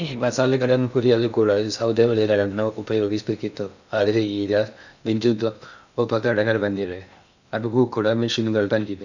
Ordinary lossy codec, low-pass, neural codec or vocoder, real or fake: none; 7.2 kHz; codec, 16 kHz in and 24 kHz out, 0.6 kbps, FocalCodec, streaming, 2048 codes; fake